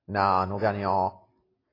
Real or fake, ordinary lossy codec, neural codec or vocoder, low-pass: real; AAC, 24 kbps; none; 5.4 kHz